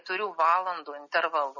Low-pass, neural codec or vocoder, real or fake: 7.2 kHz; none; real